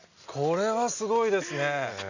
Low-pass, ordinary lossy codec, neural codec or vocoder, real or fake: 7.2 kHz; none; none; real